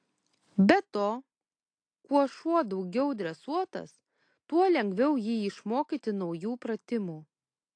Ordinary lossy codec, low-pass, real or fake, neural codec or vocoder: AAC, 48 kbps; 9.9 kHz; real; none